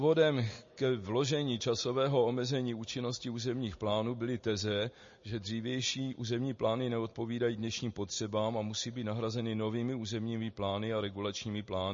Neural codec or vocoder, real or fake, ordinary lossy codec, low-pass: none; real; MP3, 32 kbps; 7.2 kHz